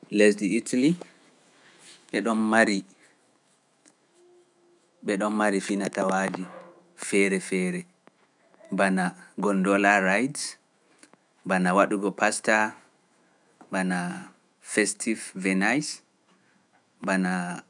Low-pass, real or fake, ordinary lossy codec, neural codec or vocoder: 10.8 kHz; fake; none; autoencoder, 48 kHz, 128 numbers a frame, DAC-VAE, trained on Japanese speech